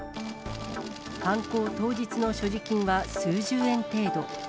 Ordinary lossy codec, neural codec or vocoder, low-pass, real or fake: none; none; none; real